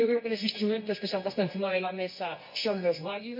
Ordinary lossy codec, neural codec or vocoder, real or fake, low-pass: MP3, 32 kbps; codec, 24 kHz, 0.9 kbps, WavTokenizer, medium music audio release; fake; 5.4 kHz